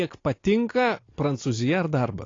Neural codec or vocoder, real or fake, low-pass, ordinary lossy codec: none; real; 7.2 kHz; AAC, 32 kbps